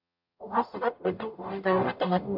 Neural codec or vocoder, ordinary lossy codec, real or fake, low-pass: codec, 44.1 kHz, 0.9 kbps, DAC; MP3, 48 kbps; fake; 5.4 kHz